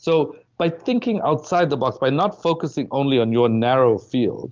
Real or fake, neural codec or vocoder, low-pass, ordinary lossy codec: real; none; 7.2 kHz; Opus, 24 kbps